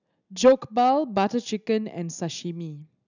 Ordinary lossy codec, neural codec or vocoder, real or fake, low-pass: none; none; real; 7.2 kHz